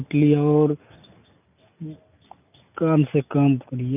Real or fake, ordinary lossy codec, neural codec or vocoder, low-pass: real; none; none; 3.6 kHz